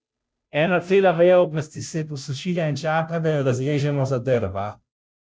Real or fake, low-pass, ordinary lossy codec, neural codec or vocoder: fake; none; none; codec, 16 kHz, 0.5 kbps, FunCodec, trained on Chinese and English, 25 frames a second